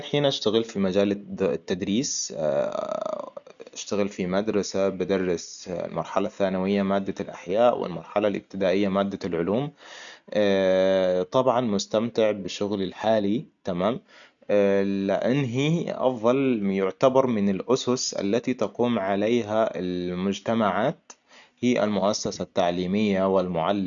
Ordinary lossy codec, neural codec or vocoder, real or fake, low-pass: none; none; real; 7.2 kHz